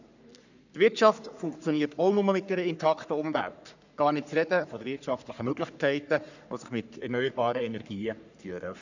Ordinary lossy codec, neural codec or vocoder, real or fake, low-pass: none; codec, 44.1 kHz, 3.4 kbps, Pupu-Codec; fake; 7.2 kHz